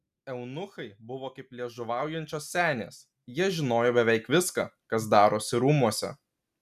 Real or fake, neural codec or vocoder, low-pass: real; none; 14.4 kHz